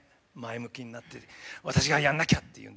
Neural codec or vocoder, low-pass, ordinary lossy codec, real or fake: none; none; none; real